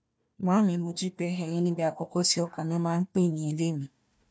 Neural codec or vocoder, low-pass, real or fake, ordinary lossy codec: codec, 16 kHz, 1 kbps, FunCodec, trained on Chinese and English, 50 frames a second; none; fake; none